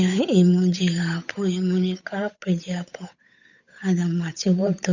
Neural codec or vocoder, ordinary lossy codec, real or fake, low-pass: codec, 16 kHz, 8 kbps, FunCodec, trained on Chinese and English, 25 frames a second; none; fake; 7.2 kHz